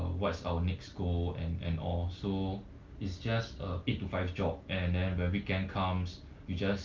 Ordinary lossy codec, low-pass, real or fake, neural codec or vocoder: Opus, 24 kbps; 7.2 kHz; real; none